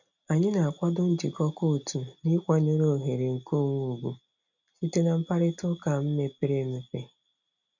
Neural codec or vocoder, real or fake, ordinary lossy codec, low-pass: none; real; none; 7.2 kHz